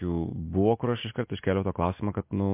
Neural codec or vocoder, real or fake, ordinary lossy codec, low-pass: none; real; MP3, 24 kbps; 3.6 kHz